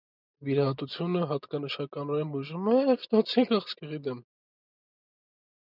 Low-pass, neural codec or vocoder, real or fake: 5.4 kHz; none; real